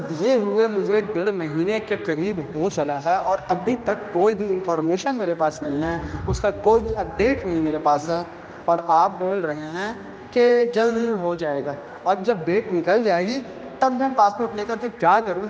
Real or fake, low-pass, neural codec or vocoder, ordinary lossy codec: fake; none; codec, 16 kHz, 1 kbps, X-Codec, HuBERT features, trained on general audio; none